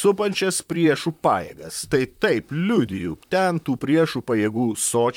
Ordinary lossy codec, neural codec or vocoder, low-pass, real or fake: MP3, 96 kbps; vocoder, 44.1 kHz, 128 mel bands, Pupu-Vocoder; 19.8 kHz; fake